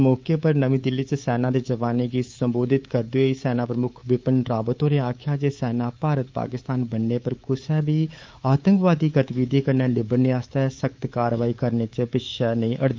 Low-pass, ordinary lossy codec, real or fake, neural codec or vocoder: 7.2 kHz; Opus, 24 kbps; fake; codec, 24 kHz, 3.1 kbps, DualCodec